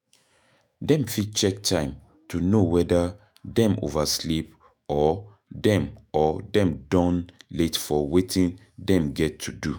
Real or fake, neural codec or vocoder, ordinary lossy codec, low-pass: fake; autoencoder, 48 kHz, 128 numbers a frame, DAC-VAE, trained on Japanese speech; none; none